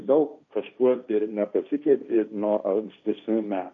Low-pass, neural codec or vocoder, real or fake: 7.2 kHz; codec, 16 kHz, 1.1 kbps, Voila-Tokenizer; fake